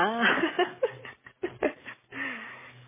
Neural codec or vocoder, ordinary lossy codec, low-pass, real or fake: codec, 44.1 kHz, 7.8 kbps, DAC; MP3, 16 kbps; 3.6 kHz; fake